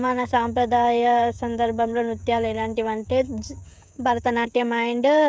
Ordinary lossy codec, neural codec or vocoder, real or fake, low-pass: none; codec, 16 kHz, 16 kbps, FreqCodec, smaller model; fake; none